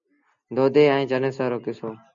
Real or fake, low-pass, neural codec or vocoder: real; 7.2 kHz; none